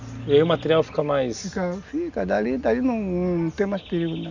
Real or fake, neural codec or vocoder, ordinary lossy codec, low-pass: real; none; none; 7.2 kHz